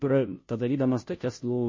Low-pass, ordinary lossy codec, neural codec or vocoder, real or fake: 7.2 kHz; MP3, 32 kbps; codec, 16 kHz, 0.5 kbps, FunCodec, trained on Chinese and English, 25 frames a second; fake